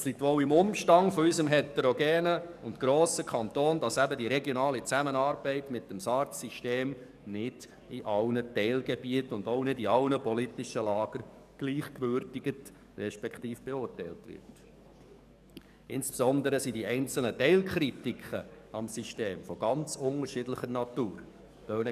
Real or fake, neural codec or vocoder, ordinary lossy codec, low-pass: fake; codec, 44.1 kHz, 7.8 kbps, DAC; none; 14.4 kHz